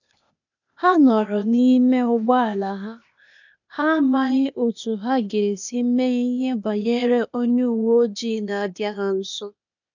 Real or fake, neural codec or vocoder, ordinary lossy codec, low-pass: fake; codec, 16 kHz, 0.8 kbps, ZipCodec; none; 7.2 kHz